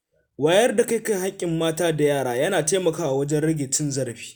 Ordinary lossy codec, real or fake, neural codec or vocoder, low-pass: none; real; none; none